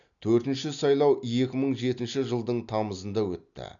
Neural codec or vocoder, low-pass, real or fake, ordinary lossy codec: none; 7.2 kHz; real; none